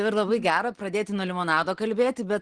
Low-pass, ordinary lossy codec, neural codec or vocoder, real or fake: 9.9 kHz; Opus, 16 kbps; none; real